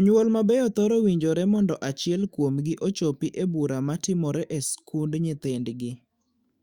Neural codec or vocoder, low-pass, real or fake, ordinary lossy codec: autoencoder, 48 kHz, 128 numbers a frame, DAC-VAE, trained on Japanese speech; 19.8 kHz; fake; Opus, 64 kbps